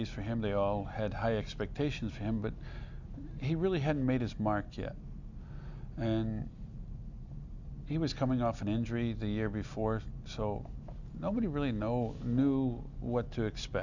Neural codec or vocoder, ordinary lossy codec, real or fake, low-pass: none; Opus, 64 kbps; real; 7.2 kHz